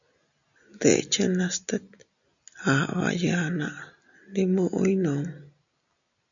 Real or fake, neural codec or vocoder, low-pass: real; none; 7.2 kHz